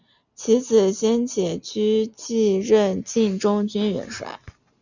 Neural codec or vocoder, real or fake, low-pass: none; real; 7.2 kHz